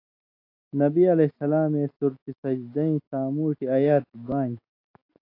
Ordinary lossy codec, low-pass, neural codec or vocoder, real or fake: AAC, 32 kbps; 5.4 kHz; none; real